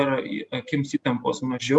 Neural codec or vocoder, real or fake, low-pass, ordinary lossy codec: none; real; 10.8 kHz; Opus, 64 kbps